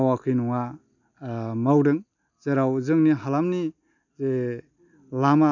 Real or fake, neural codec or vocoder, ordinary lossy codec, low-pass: real; none; none; 7.2 kHz